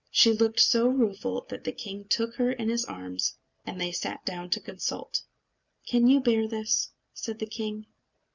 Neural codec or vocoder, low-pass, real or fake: none; 7.2 kHz; real